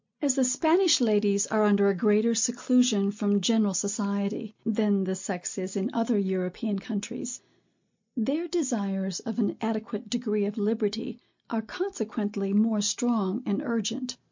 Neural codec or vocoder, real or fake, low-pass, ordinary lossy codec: none; real; 7.2 kHz; MP3, 48 kbps